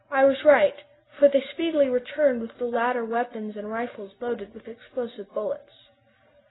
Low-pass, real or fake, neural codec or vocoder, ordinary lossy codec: 7.2 kHz; real; none; AAC, 16 kbps